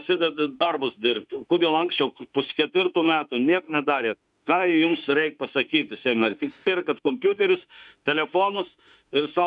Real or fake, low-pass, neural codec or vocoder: fake; 10.8 kHz; autoencoder, 48 kHz, 32 numbers a frame, DAC-VAE, trained on Japanese speech